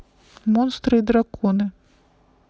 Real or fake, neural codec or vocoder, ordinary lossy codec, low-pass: real; none; none; none